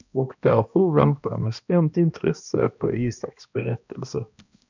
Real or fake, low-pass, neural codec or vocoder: fake; 7.2 kHz; codec, 16 kHz, 1 kbps, X-Codec, HuBERT features, trained on balanced general audio